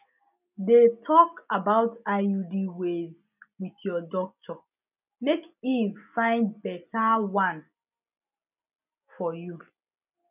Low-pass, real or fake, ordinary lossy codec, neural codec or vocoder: 3.6 kHz; real; none; none